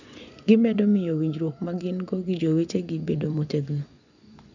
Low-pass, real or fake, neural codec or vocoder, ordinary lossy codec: 7.2 kHz; fake; vocoder, 24 kHz, 100 mel bands, Vocos; none